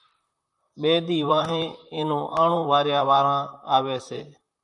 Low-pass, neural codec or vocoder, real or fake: 10.8 kHz; vocoder, 44.1 kHz, 128 mel bands, Pupu-Vocoder; fake